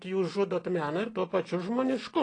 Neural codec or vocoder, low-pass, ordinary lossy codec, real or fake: none; 9.9 kHz; AAC, 32 kbps; real